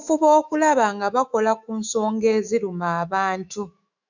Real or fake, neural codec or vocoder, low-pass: fake; autoencoder, 48 kHz, 128 numbers a frame, DAC-VAE, trained on Japanese speech; 7.2 kHz